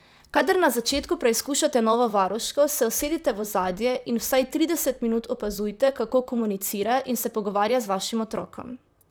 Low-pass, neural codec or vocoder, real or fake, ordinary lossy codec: none; vocoder, 44.1 kHz, 128 mel bands, Pupu-Vocoder; fake; none